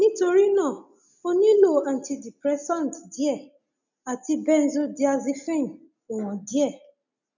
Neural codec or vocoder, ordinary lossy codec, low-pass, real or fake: none; none; 7.2 kHz; real